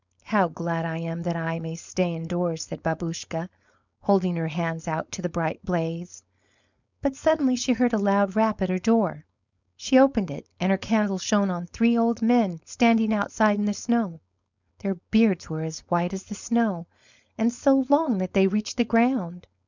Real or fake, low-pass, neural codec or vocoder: fake; 7.2 kHz; codec, 16 kHz, 4.8 kbps, FACodec